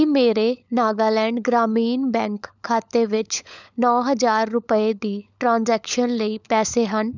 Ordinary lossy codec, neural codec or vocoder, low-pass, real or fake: none; codec, 16 kHz, 16 kbps, FunCodec, trained on LibriTTS, 50 frames a second; 7.2 kHz; fake